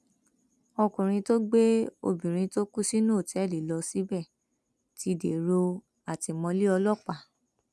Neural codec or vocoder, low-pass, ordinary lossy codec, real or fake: none; none; none; real